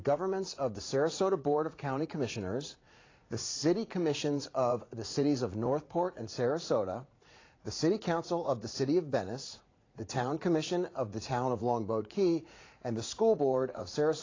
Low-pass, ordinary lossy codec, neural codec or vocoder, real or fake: 7.2 kHz; AAC, 32 kbps; vocoder, 44.1 kHz, 80 mel bands, Vocos; fake